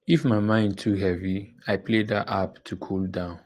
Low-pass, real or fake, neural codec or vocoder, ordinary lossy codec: 14.4 kHz; real; none; Opus, 24 kbps